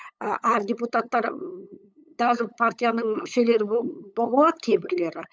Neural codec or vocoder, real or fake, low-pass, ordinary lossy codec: codec, 16 kHz, 8 kbps, FunCodec, trained on LibriTTS, 25 frames a second; fake; none; none